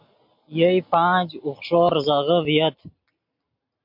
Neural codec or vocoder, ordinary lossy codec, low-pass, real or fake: none; MP3, 48 kbps; 5.4 kHz; real